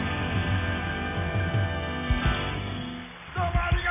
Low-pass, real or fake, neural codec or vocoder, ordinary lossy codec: 3.6 kHz; real; none; none